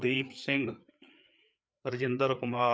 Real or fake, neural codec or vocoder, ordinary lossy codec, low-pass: fake; codec, 16 kHz, 4 kbps, FreqCodec, larger model; none; none